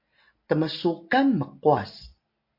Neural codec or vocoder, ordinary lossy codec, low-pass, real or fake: none; MP3, 32 kbps; 5.4 kHz; real